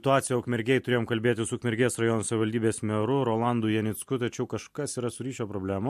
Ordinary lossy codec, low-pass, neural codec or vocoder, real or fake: MP3, 64 kbps; 14.4 kHz; none; real